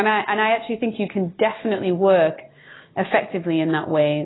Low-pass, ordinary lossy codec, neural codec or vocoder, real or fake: 7.2 kHz; AAC, 16 kbps; none; real